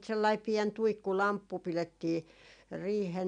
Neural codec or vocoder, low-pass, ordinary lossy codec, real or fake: none; 9.9 kHz; none; real